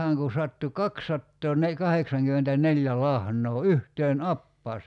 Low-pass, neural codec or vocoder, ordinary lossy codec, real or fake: 10.8 kHz; none; none; real